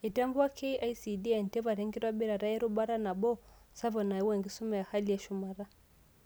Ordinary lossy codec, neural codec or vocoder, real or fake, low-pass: none; none; real; none